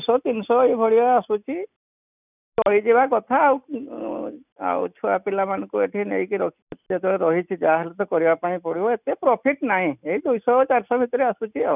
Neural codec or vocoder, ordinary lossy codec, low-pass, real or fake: none; none; 3.6 kHz; real